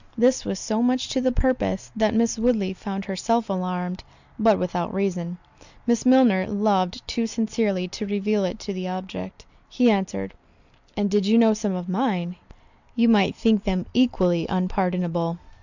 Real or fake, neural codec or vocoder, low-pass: real; none; 7.2 kHz